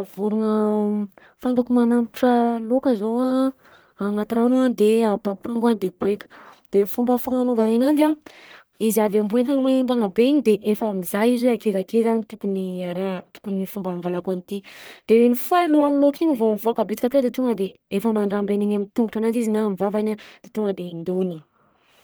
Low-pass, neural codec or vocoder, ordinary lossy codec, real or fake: none; codec, 44.1 kHz, 1.7 kbps, Pupu-Codec; none; fake